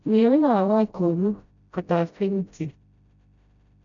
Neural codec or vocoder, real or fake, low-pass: codec, 16 kHz, 0.5 kbps, FreqCodec, smaller model; fake; 7.2 kHz